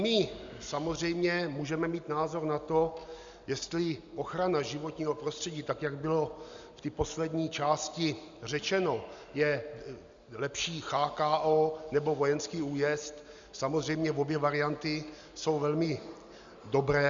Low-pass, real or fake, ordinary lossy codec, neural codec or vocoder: 7.2 kHz; real; Opus, 64 kbps; none